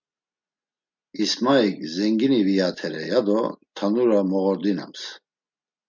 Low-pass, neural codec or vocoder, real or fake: 7.2 kHz; none; real